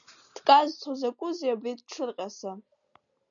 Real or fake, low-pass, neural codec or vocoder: real; 7.2 kHz; none